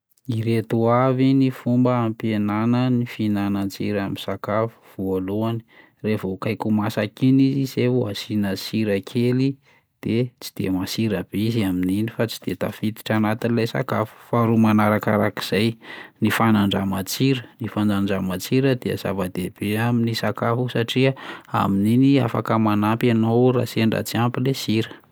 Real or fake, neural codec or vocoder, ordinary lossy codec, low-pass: real; none; none; none